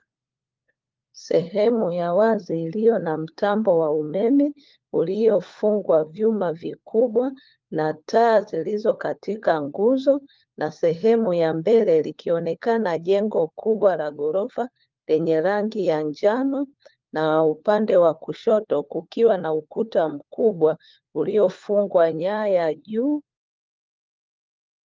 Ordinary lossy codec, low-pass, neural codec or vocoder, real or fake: Opus, 32 kbps; 7.2 kHz; codec, 16 kHz, 4 kbps, FunCodec, trained on LibriTTS, 50 frames a second; fake